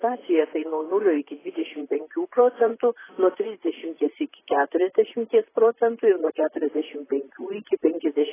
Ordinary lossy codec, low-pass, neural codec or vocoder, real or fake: AAC, 16 kbps; 3.6 kHz; vocoder, 44.1 kHz, 128 mel bands, Pupu-Vocoder; fake